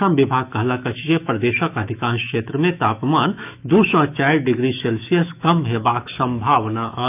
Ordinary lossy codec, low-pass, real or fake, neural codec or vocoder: none; 3.6 kHz; fake; autoencoder, 48 kHz, 128 numbers a frame, DAC-VAE, trained on Japanese speech